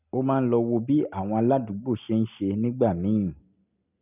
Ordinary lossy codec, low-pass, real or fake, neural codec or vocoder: none; 3.6 kHz; real; none